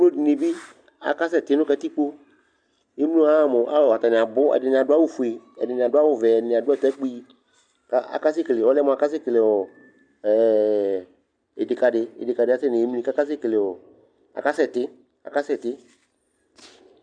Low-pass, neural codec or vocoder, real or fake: 9.9 kHz; none; real